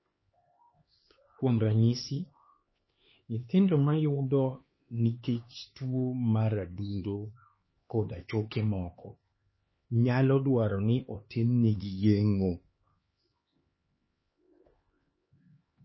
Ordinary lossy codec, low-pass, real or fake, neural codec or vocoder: MP3, 24 kbps; 7.2 kHz; fake; codec, 16 kHz, 4 kbps, X-Codec, HuBERT features, trained on LibriSpeech